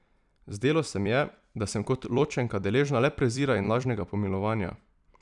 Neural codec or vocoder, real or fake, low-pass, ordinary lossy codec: vocoder, 44.1 kHz, 128 mel bands every 256 samples, BigVGAN v2; fake; 10.8 kHz; none